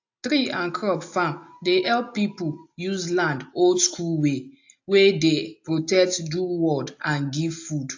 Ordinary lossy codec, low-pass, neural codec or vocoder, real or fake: AAC, 48 kbps; 7.2 kHz; none; real